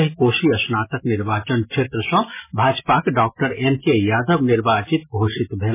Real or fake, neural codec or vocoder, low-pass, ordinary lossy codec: real; none; 3.6 kHz; MP3, 16 kbps